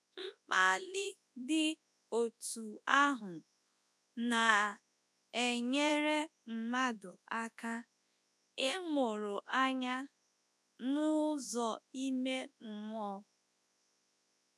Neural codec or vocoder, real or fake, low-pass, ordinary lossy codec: codec, 24 kHz, 0.9 kbps, WavTokenizer, large speech release; fake; none; none